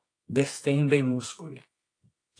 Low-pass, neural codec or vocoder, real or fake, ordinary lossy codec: 9.9 kHz; codec, 24 kHz, 0.9 kbps, WavTokenizer, medium music audio release; fake; AAC, 48 kbps